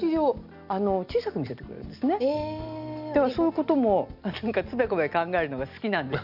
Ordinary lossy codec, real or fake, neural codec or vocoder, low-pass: none; real; none; 5.4 kHz